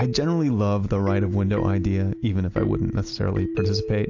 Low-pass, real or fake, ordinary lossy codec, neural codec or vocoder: 7.2 kHz; real; AAC, 48 kbps; none